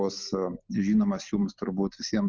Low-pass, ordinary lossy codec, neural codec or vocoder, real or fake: 7.2 kHz; Opus, 24 kbps; none; real